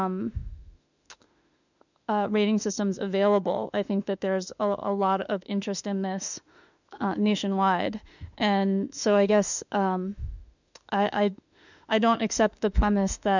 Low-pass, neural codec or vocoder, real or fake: 7.2 kHz; autoencoder, 48 kHz, 32 numbers a frame, DAC-VAE, trained on Japanese speech; fake